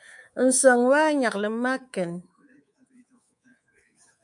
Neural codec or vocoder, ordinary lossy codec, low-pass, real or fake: codec, 24 kHz, 3.1 kbps, DualCodec; MP3, 64 kbps; 10.8 kHz; fake